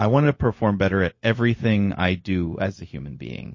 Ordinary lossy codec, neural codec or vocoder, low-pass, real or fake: MP3, 32 kbps; codec, 16 kHz, 0.4 kbps, LongCat-Audio-Codec; 7.2 kHz; fake